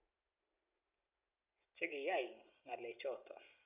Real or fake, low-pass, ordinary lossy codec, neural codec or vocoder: real; 3.6 kHz; none; none